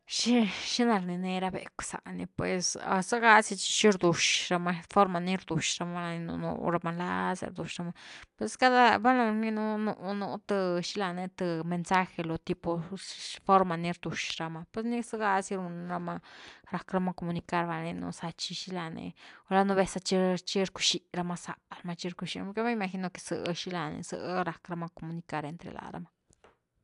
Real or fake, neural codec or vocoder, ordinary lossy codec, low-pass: real; none; AAC, 96 kbps; 10.8 kHz